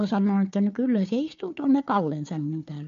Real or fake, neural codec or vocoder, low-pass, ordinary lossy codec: fake; codec, 16 kHz, 4 kbps, FunCodec, trained on Chinese and English, 50 frames a second; 7.2 kHz; MP3, 48 kbps